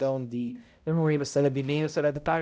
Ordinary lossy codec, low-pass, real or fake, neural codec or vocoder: none; none; fake; codec, 16 kHz, 0.5 kbps, X-Codec, HuBERT features, trained on balanced general audio